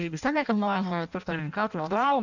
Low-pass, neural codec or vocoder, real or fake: 7.2 kHz; codec, 16 kHz in and 24 kHz out, 0.6 kbps, FireRedTTS-2 codec; fake